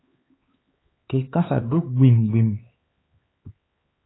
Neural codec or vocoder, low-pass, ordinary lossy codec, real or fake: codec, 16 kHz, 4 kbps, X-Codec, HuBERT features, trained on LibriSpeech; 7.2 kHz; AAC, 16 kbps; fake